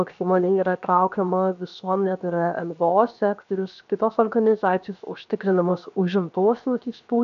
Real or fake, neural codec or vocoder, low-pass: fake; codec, 16 kHz, 0.7 kbps, FocalCodec; 7.2 kHz